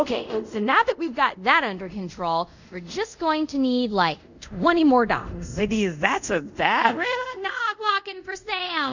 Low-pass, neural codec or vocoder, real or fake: 7.2 kHz; codec, 24 kHz, 0.5 kbps, DualCodec; fake